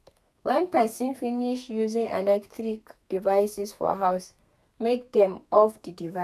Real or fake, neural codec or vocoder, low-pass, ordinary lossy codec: fake; codec, 32 kHz, 1.9 kbps, SNAC; 14.4 kHz; none